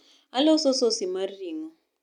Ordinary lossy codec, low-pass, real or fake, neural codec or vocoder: none; 19.8 kHz; real; none